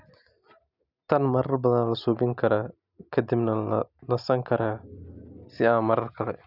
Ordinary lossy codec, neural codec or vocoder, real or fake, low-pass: none; none; real; 5.4 kHz